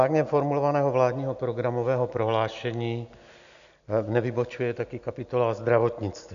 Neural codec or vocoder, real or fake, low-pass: none; real; 7.2 kHz